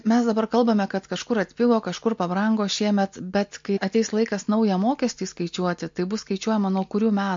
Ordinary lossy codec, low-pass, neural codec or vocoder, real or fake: MP3, 48 kbps; 7.2 kHz; none; real